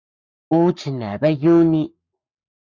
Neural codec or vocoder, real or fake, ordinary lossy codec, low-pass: codec, 44.1 kHz, 7.8 kbps, Pupu-Codec; fake; Opus, 64 kbps; 7.2 kHz